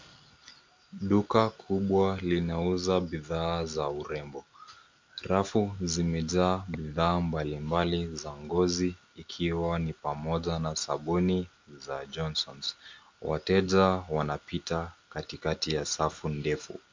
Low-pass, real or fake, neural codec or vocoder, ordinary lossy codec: 7.2 kHz; real; none; MP3, 64 kbps